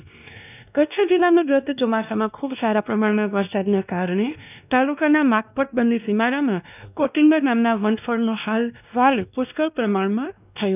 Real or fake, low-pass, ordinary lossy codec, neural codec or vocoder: fake; 3.6 kHz; none; codec, 16 kHz, 1 kbps, X-Codec, WavLM features, trained on Multilingual LibriSpeech